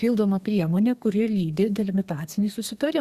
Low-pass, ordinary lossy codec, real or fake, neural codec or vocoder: 14.4 kHz; Opus, 64 kbps; fake; codec, 32 kHz, 1.9 kbps, SNAC